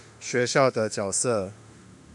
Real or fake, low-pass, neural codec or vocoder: fake; 10.8 kHz; autoencoder, 48 kHz, 32 numbers a frame, DAC-VAE, trained on Japanese speech